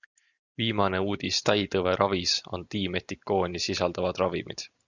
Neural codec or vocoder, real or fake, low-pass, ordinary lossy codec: none; real; 7.2 kHz; Opus, 64 kbps